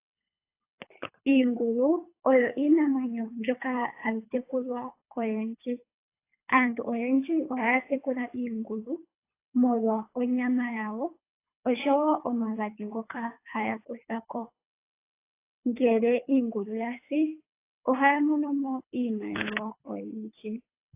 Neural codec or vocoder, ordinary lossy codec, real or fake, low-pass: codec, 24 kHz, 3 kbps, HILCodec; AAC, 24 kbps; fake; 3.6 kHz